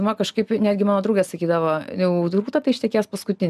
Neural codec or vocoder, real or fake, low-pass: none; real; 14.4 kHz